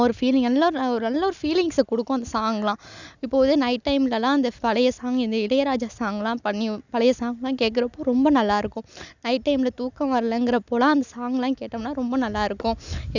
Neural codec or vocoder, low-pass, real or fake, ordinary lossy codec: none; 7.2 kHz; real; none